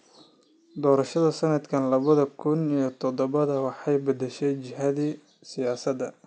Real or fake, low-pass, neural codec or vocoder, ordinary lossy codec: real; none; none; none